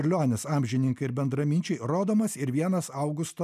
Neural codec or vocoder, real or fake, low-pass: none; real; 14.4 kHz